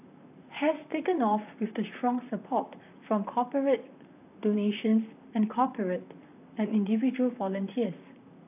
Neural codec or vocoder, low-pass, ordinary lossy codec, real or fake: vocoder, 44.1 kHz, 128 mel bands, Pupu-Vocoder; 3.6 kHz; AAC, 32 kbps; fake